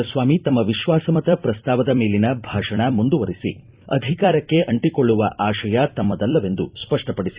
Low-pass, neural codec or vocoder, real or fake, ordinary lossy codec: 3.6 kHz; vocoder, 44.1 kHz, 128 mel bands every 256 samples, BigVGAN v2; fake; Opus, 64 kbps